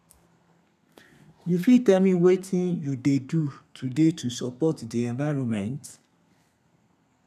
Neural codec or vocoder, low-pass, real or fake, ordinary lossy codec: codec, 32 kHz, 1.9 kbps, SNAC; 14.4 kHz; fake; none